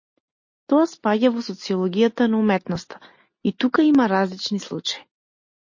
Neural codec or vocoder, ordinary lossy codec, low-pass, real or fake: none; MP3, 32 kbps; 7.2 kHz; real